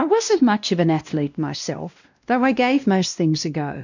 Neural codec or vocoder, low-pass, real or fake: codec, 16 kHz, 1 kbps, X-Codec, WavLM features, trained on Multilingual LibriSpeech; 7.2 kHz; fake